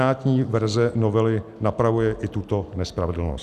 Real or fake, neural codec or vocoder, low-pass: real; none; 14.4 kHz